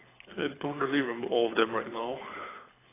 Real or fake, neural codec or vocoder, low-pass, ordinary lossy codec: fake; codec, 24 kHz, 6 kbps, HILCodec; 3.6 kHz; AAC, 16 kbps